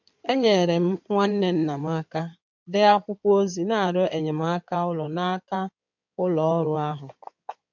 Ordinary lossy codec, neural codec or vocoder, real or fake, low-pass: MP3, 64 kbps; codec, 16 kHz in and 24 kHz out, 2.2 kbps, FireRedTTS-2 codec; fake; 7.2 kHz